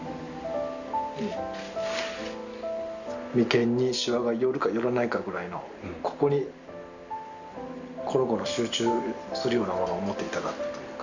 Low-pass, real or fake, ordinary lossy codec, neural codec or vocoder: 7.2 kHz; real; none; none